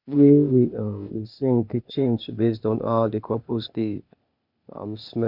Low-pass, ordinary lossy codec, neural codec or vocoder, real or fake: 5.4 kHz; none; codec, 16 kHz, 0.8 kbps, ZipCodec; fake